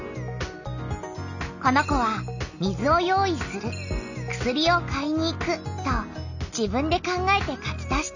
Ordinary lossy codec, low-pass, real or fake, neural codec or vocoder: none; 7.2 kHz; real; none